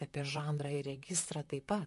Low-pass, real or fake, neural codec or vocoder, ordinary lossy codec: 14.4 kHz; fake; vocoder, 44.1 kHz, 128 mel bands, Pupu-Vocoder; MP3, 48 kbps